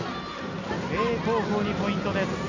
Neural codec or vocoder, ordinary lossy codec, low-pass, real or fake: none; MP3, 64 kbps; 7.2 kHz; real